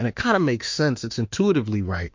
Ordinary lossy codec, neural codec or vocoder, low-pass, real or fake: MP3, 48 kbps; autoencoder, 48 kHz, 32 numbers a frame, DAC-VAE, trained on Japanese speech; 7.2 kHz; fake